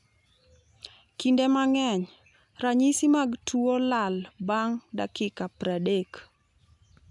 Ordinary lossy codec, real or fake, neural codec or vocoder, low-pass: none; real; none; 10.8 kHz